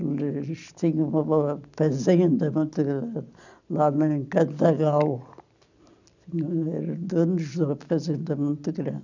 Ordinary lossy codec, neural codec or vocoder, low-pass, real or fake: none; none; 7.2 kHz; real